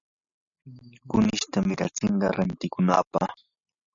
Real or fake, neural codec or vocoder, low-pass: real; none; 7.2 kHz